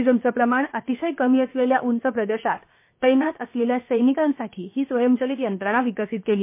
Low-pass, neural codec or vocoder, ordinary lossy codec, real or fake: 3.6 kHz; codec, 16 kHz, 0.7 kbps, FocalCodec; MP3, 24 kbps; fake